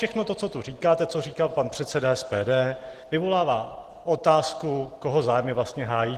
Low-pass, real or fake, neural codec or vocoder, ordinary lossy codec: 14.4 kHz; real; none; Opus, 16 kbps